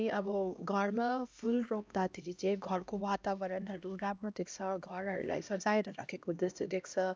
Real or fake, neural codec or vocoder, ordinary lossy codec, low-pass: fake; codec, 16 kHz, 1 kbps, X-Codec, HuBERT features, trained on LibriSpeech; none; none